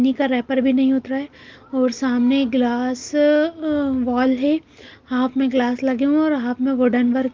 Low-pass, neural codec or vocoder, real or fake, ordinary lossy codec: 7.2 kHz; none; real; Opus, 32 kbps